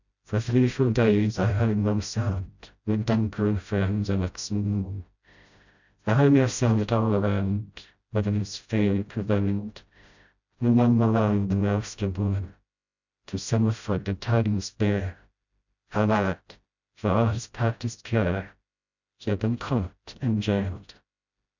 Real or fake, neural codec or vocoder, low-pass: fake; codec, 16 kHz, 0.5 kbps, FreqCodec, smaller model; 7.2 kHz